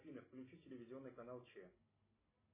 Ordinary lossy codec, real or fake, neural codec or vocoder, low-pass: MP3, 16 kbps; real; none; 3.6 kHz